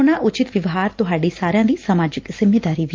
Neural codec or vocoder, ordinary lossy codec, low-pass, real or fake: none; Opus, 32 kbps; 7.2 kHz; real